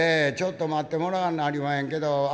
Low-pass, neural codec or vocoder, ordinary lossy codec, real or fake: none; none; none; real